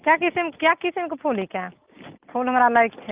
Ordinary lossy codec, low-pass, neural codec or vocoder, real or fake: Opus, 24 kbps; 3.6 kHz; none; real